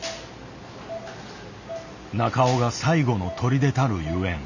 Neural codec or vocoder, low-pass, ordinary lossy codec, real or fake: none; 7.2 kHz; none; real